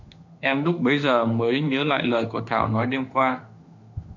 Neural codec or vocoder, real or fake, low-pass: autoencoder, 48 kHz, 32 numbers a frame, DAC-VAE, trained on Japanese speech; fake; 7.2 kHz